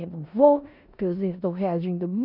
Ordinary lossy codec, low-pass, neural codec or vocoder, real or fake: none; 5.4 kHz; codec, 16 kHz in and 24 kHz out, 0.9 kbps, LongCat-Audio-Codec, four codebook decoder; fake